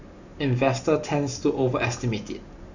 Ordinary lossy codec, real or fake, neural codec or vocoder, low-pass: Opus, 64 kbps; real; none; 7.2 kHz